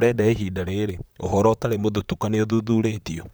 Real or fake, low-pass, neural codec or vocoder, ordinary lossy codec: fake; none; vocoder, 44.1 kHz, 128 mel bands, Pupu-Vocoder; none